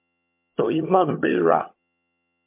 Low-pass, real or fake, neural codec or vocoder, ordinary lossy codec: 3.6 kHz; fake; vocoder, 22.05 kHz, 80 mel bands, HiFi-GAN; MP3, 32 kbps